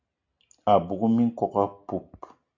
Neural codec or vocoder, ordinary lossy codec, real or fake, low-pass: none; AAC, 48 kbps; real; 7.2 kHz